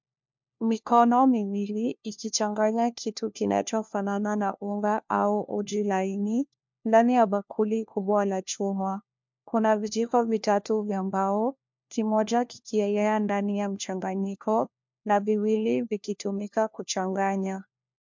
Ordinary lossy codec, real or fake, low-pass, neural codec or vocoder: MP3, 64 kbps; fake; 7.2 kHz; codec, 16 kHz, 1 kbps, FunCodec, trained on LibriTTS, 50 frames a second